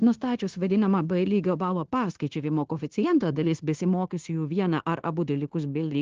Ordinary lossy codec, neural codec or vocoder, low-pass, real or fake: Opus, 16 kbps; codec, 16 kHz, 0.9 kbps, LongCat-Audio-Codec; 7.2 kHz; fake